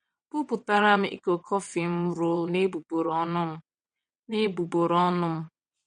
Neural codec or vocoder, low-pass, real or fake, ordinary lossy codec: vocoder, 22.05 kHz, 80 mel bands, WaveNeXt; 9.9 kHz; fake; MP3, 48 kbps